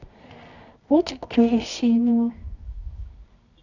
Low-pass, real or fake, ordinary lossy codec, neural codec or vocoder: 7.2 kHz; fake; none; codec, 24 kHz, 0.9 kbps, WavTokenizer, medium music audio release